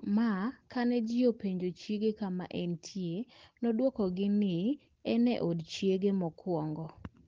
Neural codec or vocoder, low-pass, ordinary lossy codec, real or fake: none; 7.2 kHz; Opus, 16 kbps; real